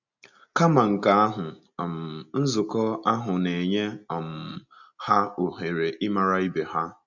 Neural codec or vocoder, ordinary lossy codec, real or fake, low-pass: none; none; real; 7.2 kHz